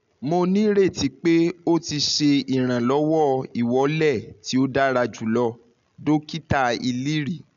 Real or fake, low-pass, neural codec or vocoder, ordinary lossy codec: real; 7.2 kHz; none; none